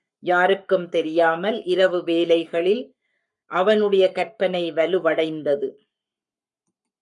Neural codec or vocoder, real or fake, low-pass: codec, 44.1 kHz, 7.8 kbps, Pupu-Codec; fake; 10.8 kHz